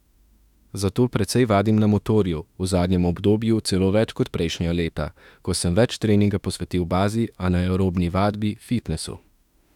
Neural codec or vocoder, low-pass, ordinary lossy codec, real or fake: autoencoder, 48 kHz, 32 numbers a frame, DAC-VAE, trained on Japanese speech; 19.8 kHz; none; fake